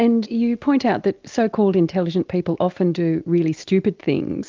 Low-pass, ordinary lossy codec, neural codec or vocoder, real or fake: 7.2 kHz; Opus, 32 kbps; none; real